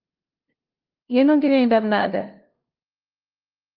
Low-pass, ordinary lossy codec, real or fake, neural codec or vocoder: 5.4 kHz; Opus, 32 kbps; fake; codec, 16 kHz, 0.5 kbps, FunCodec, trained on LibriTTS, 25 frames a second